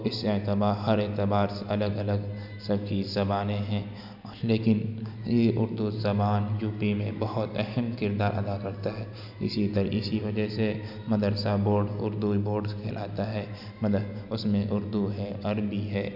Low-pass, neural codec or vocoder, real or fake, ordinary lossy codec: 5.4 kHz; none; real; none